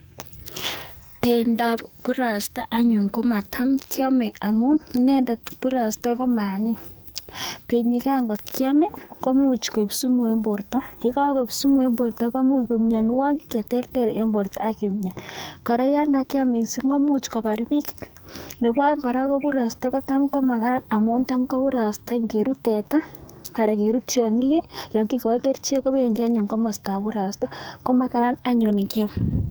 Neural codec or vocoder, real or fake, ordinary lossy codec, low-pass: codec, 44.1 kHz, 2.6 kbps, SNAC; fake; none; none